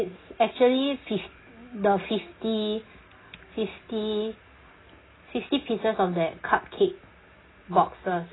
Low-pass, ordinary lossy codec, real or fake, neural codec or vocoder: 7.2 kHz; AAC, 16 kbps; real; none